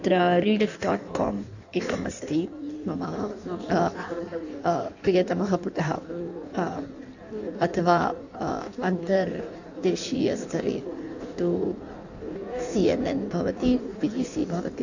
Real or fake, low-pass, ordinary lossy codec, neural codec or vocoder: fake; 7.2 kHz; none; codec, 16 kHz in and 24 kHz out, 1.1 kbps, FireRedTTS-2 codec